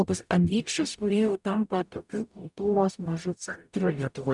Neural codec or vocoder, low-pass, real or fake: codec, 44.1 kHz, 0.9 kbps, DAC; 10.8 kHz; fake